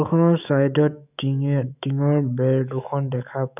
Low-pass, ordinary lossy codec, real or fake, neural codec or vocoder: 3.6 kHz; none; fake; autoencoder, 48 kHz, 128 numbers a frame, DAC-VAE, trained on Japanese speech